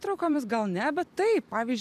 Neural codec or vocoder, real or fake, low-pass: vocoder, 44.1 kHz, 128 mel bands every 256 samples, BigVGAN v2; fake; 14.4 kHz